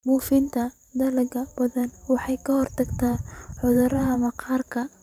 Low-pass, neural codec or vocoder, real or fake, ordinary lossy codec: 19.8 kHz; vocoder, 44.1 kHz, 128 mel bands every 512 samples, BigVGAN v2; fake; none